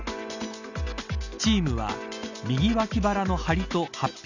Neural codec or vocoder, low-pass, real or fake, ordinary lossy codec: none; 7.2 kHz; real; none